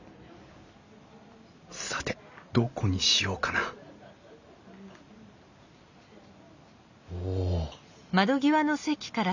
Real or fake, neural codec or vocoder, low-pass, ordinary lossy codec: real; none; 7.2 kHz; none